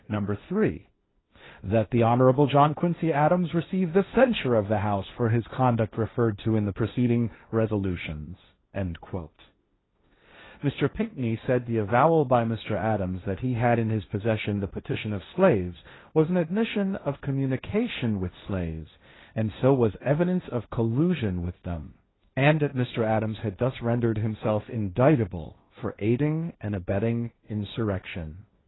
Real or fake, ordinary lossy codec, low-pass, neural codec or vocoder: fake; AAC, 16 kbps; 7.2 kHz; codec, 16 kHz, 1.1 kbps, Voila-Tokenizer